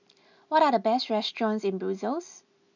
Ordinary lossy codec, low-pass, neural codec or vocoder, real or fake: none; 7.2 kHz; none; real